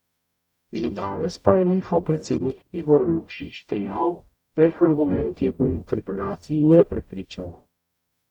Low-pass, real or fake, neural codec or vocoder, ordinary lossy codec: 19.8 kHz; fake; codec, 44.1 kHz, 0.9 kbps, DAC; none